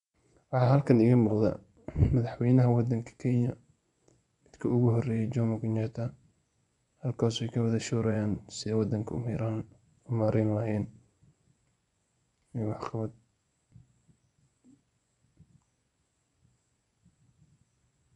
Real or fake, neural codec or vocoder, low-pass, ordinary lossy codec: fake; vocoder, 24 kHz, 100 mel bands, Vocos; 10.8 kHz; none